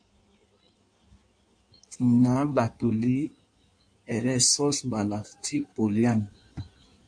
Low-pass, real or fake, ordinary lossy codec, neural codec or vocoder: 9.9 kHz; fake; MP3, 64 kbps; codec, 16 kHz in and 24 kHz out, 1.1 kbps, FireRedTTS-2 codec